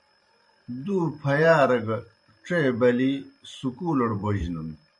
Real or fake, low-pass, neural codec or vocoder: fake; 10.8 kHz; vocoder, 44.1 kHz, 128 mel bands every 512 samples, BigVGAN v2